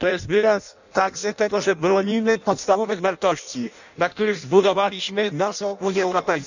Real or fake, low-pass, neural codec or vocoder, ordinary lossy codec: fake; 7.2 kHz; codec, 16 kHz in and 24 kHz out, 0.6 kbps, FireRedTTS-2 codec; none